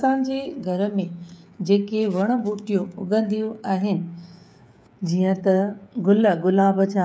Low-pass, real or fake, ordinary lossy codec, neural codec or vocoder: none; fake; none; codec, 16 kHz, 16 kbps, FreqCodec, smaller model